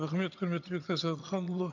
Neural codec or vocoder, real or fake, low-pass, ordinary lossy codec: vocoder, 22.05 kHz, 80 mel bands, HiFi-GAN; fake; 7.2 kHz; none